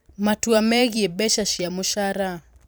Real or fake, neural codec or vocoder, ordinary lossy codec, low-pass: fake; vocoder, 44.1 kHz, 128 mel bands every 512 samples, BigVGAN v2; none; none